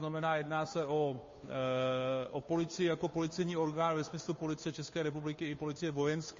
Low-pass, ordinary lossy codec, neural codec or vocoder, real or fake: 7.2 kHz; MP3, 32 kbps; codec, 16 kHz, 2 kbps, FunCodec, trained on Chinese and English, 25 frames a second; fake